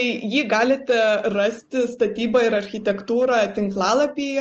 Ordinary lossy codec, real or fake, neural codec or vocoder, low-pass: Opus, 24 kbps; real; none; 7.2 kHz